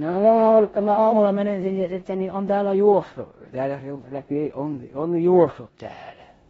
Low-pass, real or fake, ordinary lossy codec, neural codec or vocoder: 10.8 kHz; fake; AAC, 24 kbps; codec, 16 kHz in and 24 kHz out, 0.9 kbps, LongCat-Audio-Codec, four codebook decoder